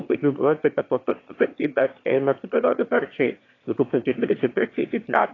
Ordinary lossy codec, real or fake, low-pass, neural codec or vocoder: AAC, 32 kbps; fake; 7.2 kHz; autoencoder, 22.05 kHz, a latent of 192 numbers a frame, VITS, trained on one speaker